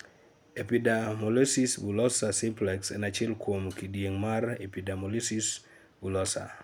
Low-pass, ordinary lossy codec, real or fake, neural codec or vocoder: none; none; real; none